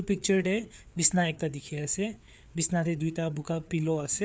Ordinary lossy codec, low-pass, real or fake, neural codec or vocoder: none; none; fake; codec, 16 kHz, 4 kbps, FreqCodec, larger model